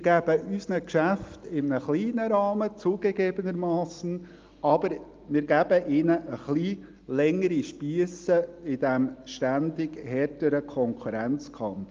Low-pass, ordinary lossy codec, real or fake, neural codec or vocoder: 7.2 kHz; Opus, 32 kbps; real; none